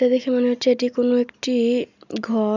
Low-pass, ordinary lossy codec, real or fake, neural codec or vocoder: 7.2 kHz; none; real; none